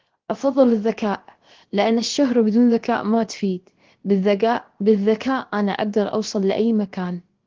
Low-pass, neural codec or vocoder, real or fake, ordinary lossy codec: 7.2 kHz; codec, 16 kHz, 0.7 kbps, FocalCodec; fake; Opus, 16 kbps